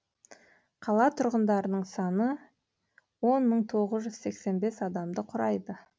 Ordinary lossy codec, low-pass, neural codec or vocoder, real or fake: none; none; none; real